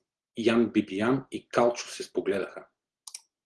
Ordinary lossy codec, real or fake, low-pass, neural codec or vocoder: Opus, 16 kbps; real; 10.8 kHz; none